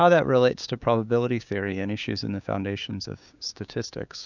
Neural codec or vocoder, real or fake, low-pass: codec, 16 kHz, 6 kbps, DAC; fake; 7.2 kHz